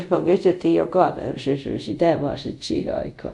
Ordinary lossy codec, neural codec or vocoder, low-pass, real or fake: none; codec, 24 kHz, 0.5 kbps, DualCodec; 10.8 kHz; fake